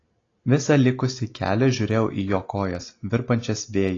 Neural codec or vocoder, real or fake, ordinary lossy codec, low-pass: none; real; AAC, 32 kbps; 7.2 kHz